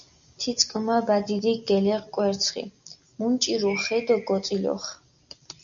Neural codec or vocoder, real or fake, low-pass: none; real; 7.2 kHz